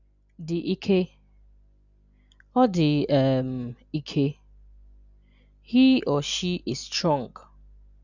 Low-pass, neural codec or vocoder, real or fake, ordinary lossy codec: 7.2 kHz; none; real; none